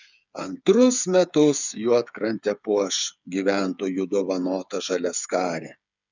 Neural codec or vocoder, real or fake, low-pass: codec, 16 kHz, 8 kbps, FreqCodec, smaller model; fake; 7.2 kHz